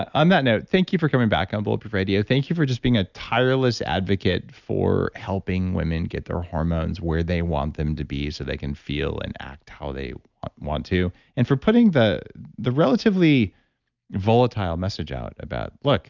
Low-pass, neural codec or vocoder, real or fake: 7.2 kHz; none; real